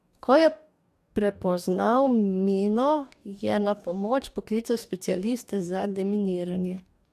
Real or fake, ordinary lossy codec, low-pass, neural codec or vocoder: fake; AAC, 96 kbps; 14.4 kHz; codec, 44.1 kHz, 2.6 kbps, DAC